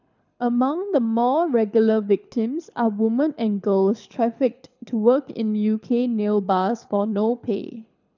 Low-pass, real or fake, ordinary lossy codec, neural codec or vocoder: 7.2 kHz; fake; none; codec, 24 kHz, 6 kbps, HILCodec